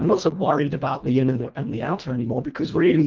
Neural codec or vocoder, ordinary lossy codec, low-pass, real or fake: codec, 24 kHz, 1.5 kbps, HILCodec; Opus, 24 kbps; 7.2 kHz; fake